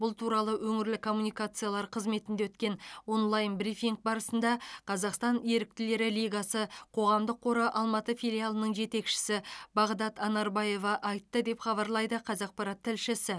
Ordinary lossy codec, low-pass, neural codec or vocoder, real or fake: none; none; none; real